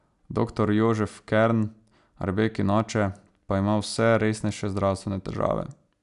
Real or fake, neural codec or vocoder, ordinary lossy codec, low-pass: real; none; none; 10.8 kHz